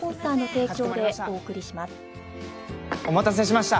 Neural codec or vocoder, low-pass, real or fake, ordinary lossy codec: none; none; real; none